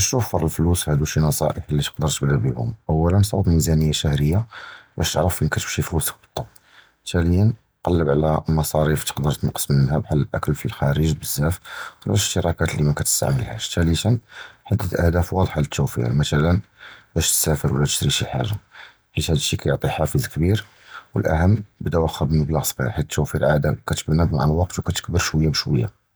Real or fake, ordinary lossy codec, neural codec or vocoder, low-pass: real; none; none; none